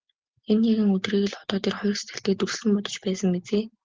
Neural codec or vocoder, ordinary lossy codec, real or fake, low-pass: none; Opus, 16 kbps; real; 7.2 kHz